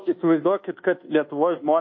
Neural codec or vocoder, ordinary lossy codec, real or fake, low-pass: codec, 24 kHz, 1.2 kbps, DualCodec; MP3, 32 kbps; fake; 7.2 kHz